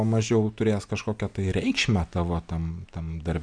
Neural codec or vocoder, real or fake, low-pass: none; real; 9.9 kHz